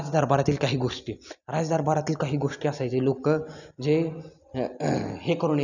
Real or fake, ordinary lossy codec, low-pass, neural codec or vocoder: real; none; 7.2 kHz; none